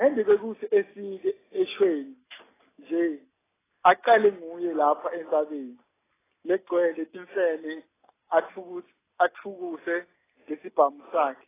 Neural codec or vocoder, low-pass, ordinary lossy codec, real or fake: none; 3.6 kHz; AAC, 16 kbps; real